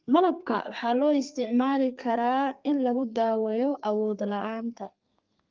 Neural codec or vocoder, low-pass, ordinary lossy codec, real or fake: codec, 32 kHz, 1.9 kbps, SNAC; 7.2 kHz; Opus, 32 kbps; fake